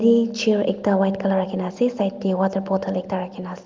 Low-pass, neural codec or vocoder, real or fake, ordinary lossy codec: 7.2 kHz; none; real; Opus, 32 kbps